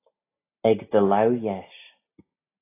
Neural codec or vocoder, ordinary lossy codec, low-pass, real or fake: none; AAC, 24 kbps; 3.6 kHz; real